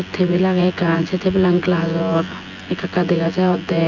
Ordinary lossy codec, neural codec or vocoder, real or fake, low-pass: none; vocoder, 24 kHz, 100 mel bands, Vocos; fake; 7.2 kHz